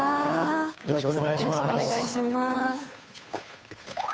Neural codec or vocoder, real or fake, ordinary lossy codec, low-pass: codec, 16 kHz, 2 kbps, FunCodec, trained on Chinese and English, 25 frames a second; fake; none; none